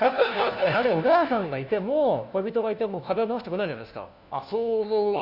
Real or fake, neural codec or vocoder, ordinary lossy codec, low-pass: fake; codec, 16 kHz, 1 kbps, FunCodec, trained on LibriTTS, 50 frames a second; AAC, 48 kbps; 5.4 kHz